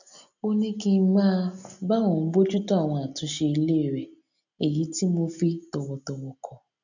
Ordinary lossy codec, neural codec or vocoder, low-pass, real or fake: none; none; 7.2 kHz; real